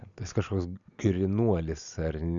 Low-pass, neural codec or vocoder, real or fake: 7.2 kHz; none; real